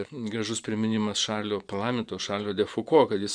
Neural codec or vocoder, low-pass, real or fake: vocoder, 24 kHz, 100 mel bands, Vocos; 9.9 kHz; fake